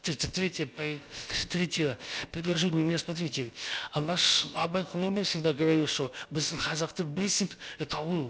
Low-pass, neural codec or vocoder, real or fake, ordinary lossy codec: none; codec, 16 kHz, about 1 kbps, DyCAST, with the encoder's durations; fake; none